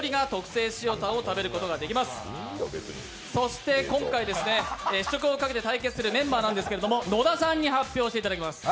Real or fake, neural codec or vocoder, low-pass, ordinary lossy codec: real; none; none; none